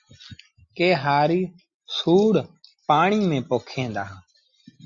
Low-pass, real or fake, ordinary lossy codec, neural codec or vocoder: 7.2 kHz; real; Opus, 64 kbps; none